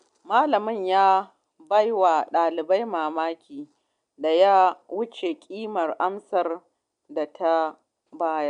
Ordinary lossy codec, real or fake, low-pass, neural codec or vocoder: none; real; 9.9 kHz; none